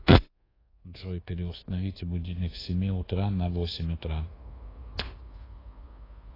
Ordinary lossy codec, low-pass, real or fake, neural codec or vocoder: AAC, 24 kbps; 5.4 kHz; fake; codec, 24 kHz, 1.2 kbps, DualCodec